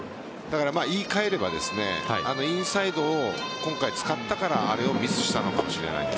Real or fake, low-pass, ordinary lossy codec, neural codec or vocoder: real; none; none; none